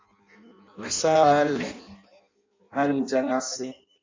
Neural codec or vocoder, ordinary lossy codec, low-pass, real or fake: codec, 16 kHz in and 24 kHz out, 0.6 kbps, FireRedTTS-2 codec; MP3, 64 kbps; 7.2 kHz; fake